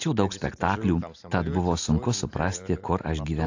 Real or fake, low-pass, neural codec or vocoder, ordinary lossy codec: real; 7.2 kHz; none; AAC, 48 kbps